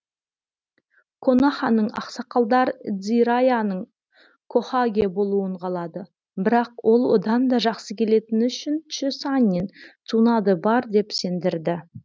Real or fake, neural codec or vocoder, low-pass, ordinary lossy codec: real; none; none; none